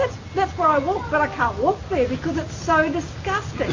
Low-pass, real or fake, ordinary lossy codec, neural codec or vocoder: 7.2 kHz; real; AAC, 32 kbps; none